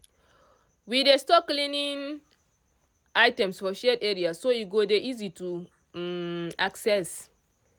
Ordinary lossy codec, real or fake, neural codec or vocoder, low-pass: none; real; none; none